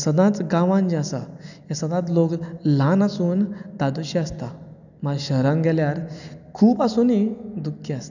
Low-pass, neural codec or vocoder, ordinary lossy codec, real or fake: 7.2 kHz; none; none; real